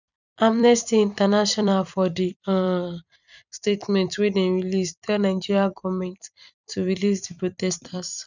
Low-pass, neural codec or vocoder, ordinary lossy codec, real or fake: 7.2 kHz; vocoder, 44.1 kHz, 128 mel bands every 256 samples, BigVGAN v2; none; fake